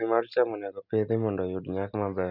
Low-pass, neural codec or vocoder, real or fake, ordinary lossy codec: 5.4 kHz; none; real; none